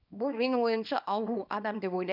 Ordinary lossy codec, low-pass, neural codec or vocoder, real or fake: none; 5.4 kHz; codec, 24 kHz, 0.9 kbps, WavTokenizer, small release; fake